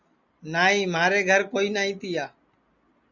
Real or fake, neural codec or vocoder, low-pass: real; none; 7.2 kHz